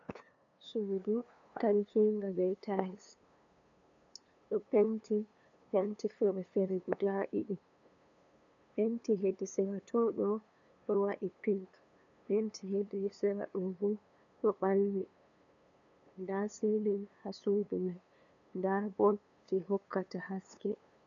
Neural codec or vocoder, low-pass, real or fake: codec, 16 kHz, 2 kbps, FunCodec, trained on LibriTTS, 25 frames a second; 7.2 kHz; fake